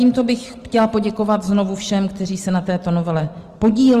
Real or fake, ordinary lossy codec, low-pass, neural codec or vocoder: real; Opus, 24 kbps; 14.4 kHz; none